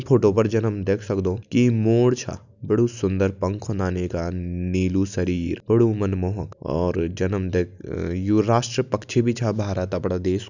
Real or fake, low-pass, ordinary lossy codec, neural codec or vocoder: real; 7.2 kHz; none; none